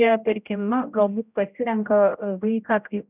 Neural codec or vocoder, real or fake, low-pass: codec, 16 kHz, 0.5 kbps, X-Codec, HuBERT features, trained on general audio; fake; 3.6 kHz